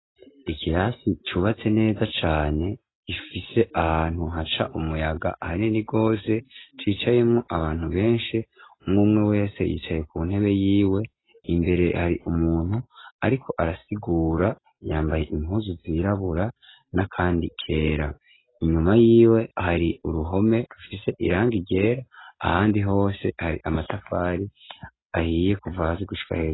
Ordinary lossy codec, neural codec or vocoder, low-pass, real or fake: AAC, 16 kbps; none; 7.2 kHz; real